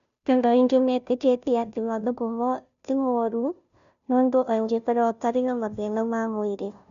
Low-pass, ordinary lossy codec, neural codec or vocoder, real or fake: 7.2 kHz; none; codec, 16 kHz, 0.5 kbps, FunCodec, trained on Chinese and English, 25 frames a second; fake